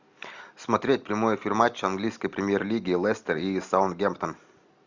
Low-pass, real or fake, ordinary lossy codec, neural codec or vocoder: 7.2 kHz; real; Opus, 64 kbps; none